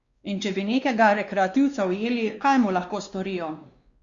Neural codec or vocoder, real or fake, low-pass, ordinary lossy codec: codec, 16 kHz, 2 kbps, X-Codec, WavLM features, trained on Multilingual LibriSpeech; fake; 7.2 kHz; Opus, 64 kbps